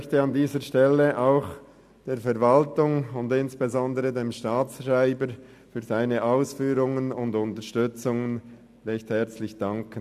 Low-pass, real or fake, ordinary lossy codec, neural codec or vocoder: 14.4 kHz; real; AAC, 96 kbps; none